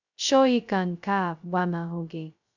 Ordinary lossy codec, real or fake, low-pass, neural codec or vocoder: none; fake; 7.2 kHz; codec, 16 kHz, 0.2 kbps, FocalCodec